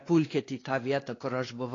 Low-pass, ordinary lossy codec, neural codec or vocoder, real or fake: 7.2 kHz; AAC, 32 kbps; codec, 16 kHz, 2 kbps, X-Codec, WavLM features, trained on Multilingual LibriSpeech; fake